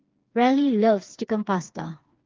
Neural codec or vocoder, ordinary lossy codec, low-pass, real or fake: codec, 16 kHz, 4 kbps, FreqCodec, smaller model; Opus, 32 kbps; 7.2 kHz; fake